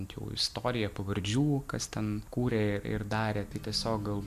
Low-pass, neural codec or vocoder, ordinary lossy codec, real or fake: 14.4 kHz; none; AAC, 96 kbps; real